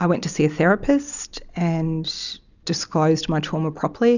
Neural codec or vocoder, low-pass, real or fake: none; 7.2 kHz; real